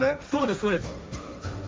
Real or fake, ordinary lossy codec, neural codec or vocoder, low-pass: fake; none; codec, 16 kHz, 1.1 kbps, Voila-Tokenizer; none